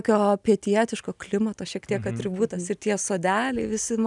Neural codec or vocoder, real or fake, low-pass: none; real; 10.8 kHz